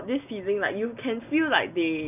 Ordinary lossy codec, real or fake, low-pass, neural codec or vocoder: none; real; 3.6 kHz; none